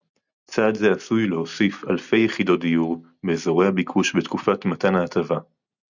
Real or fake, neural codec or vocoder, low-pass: real; none; 7.2 kHz